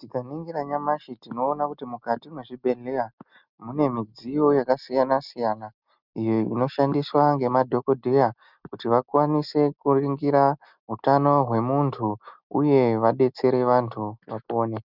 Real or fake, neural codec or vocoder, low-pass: real; none; 5.4 kHz